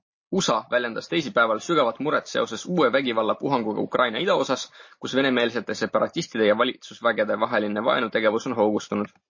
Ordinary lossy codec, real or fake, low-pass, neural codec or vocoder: MP3, 32 kbps; real; 7.2 kHz; none